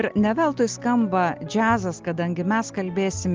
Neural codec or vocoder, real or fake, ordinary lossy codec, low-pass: none; real; Opus, 32 kbps; 7.2 kHz